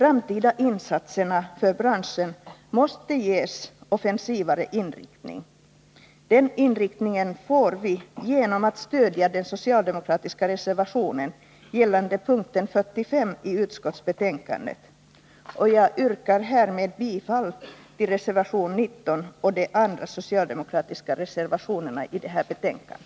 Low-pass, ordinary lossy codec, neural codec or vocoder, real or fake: none; none; none; real